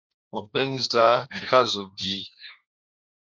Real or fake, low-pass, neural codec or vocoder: fake; 7.2 kHz; codec, 16 kHz, 1.1 kbps, Voila-Tokenizer